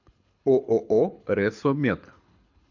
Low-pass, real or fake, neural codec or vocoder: 7.2 kHz; fake; codec, 24 kHz, 6 kbps, HILCodec